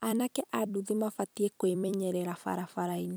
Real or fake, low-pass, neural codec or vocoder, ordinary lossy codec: fake; none; vocoder, 44.1 kHz, 128 mel bands every 256 samples, BigVGAN v2; none